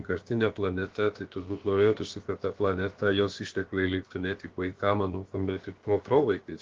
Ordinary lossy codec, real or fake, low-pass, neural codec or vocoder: Opus, 16 kbps; fake; 7.2 kHz; codec, 16 kHz, about 1 kbps, DyCAST, with the encoder's durations